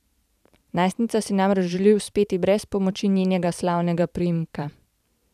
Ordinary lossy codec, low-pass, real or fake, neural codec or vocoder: none; 14.4 kHz; fake; vocoder, 44.1 kHz, 128 mel bands every 512 samples, BigVGAN v2